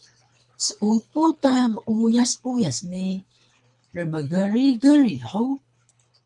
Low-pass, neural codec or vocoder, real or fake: 10.8 kHz; codec, 24 kHz, 3 kbps, HILCodec; fake